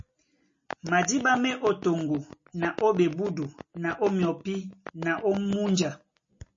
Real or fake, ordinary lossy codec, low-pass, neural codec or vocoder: real; MP3, 32 kbps; 7.2 kHz; none